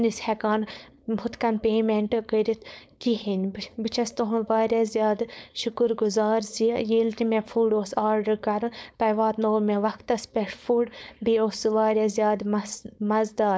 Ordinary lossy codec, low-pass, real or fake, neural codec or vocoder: none; none; fake; codec, 16 kHz, 4.8 kbps, FACodec